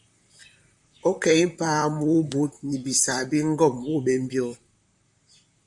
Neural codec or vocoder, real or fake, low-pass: vocoder, 44.1 kHz, 128 mel bands, Pupu-Vocoder; fake; 10.8 kHz